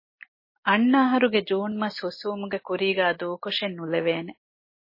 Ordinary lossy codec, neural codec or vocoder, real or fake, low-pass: MP3, 24 kbps; none; real; 5.4 kHz